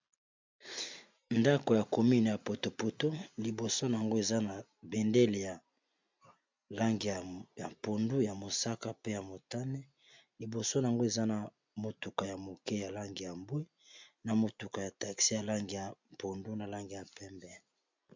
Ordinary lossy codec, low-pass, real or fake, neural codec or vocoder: MP3, 64 kbps; 7.2 kHz; real; none